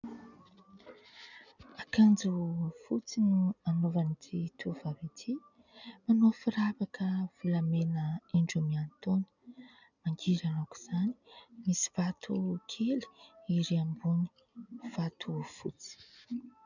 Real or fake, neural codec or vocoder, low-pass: real; none; 7.2 kHz